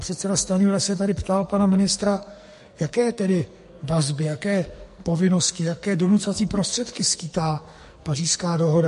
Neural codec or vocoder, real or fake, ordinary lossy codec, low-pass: codec, 44.1 kHz, 2.6 kbps, SNAC; fake; MP3, 48 kbps; 14.4 kHz